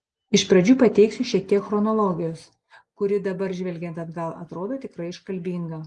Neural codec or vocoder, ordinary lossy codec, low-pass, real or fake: none; Opus, 24 kbps; 10.8 kHz; real